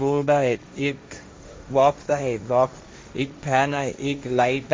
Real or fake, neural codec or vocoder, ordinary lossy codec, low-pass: fake; codec, 16 kHz, 1.1 kbps, Voila-Tokenizer; none; none